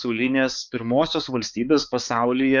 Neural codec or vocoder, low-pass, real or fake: codec, 16 kHz, 4 kbps, X-Codec, HuBERT features, trained on balanced general audio; 7.2 kHz; fake